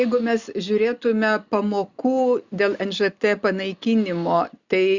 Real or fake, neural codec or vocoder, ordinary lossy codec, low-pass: real; none; Opus, 64 kbps; 7.2 kHz